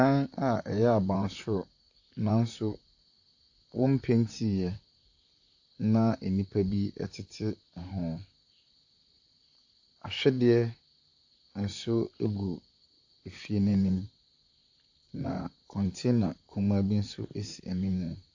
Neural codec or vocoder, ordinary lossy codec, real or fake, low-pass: vocoder, 44.1 kHz, 128 mel bands, Pupu-Vocoder; AAC, 48 kbps; fake; 7.2 kHz